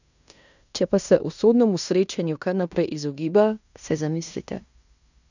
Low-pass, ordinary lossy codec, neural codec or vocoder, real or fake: 7.2 kHz; none; codec, 16 kHz in and 24 kHz out, 0.9 kbps, LongCat-Audio-Codec, fine tuned four codebook decoder; fake